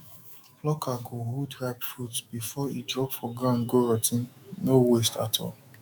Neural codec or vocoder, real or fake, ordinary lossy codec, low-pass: autoencoder, 48 kHz, 128 numbers a frame, DAC-VAE, trained on Japanese speech; fake; none; none